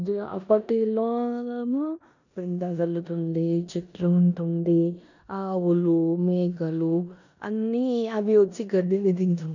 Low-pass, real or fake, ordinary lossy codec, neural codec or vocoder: 7.2 kHz; fake; none; codec, 16 kHz in and 24 kHz out, 0.9 kbps, LongCat-Audio-Codec, four codebook decoder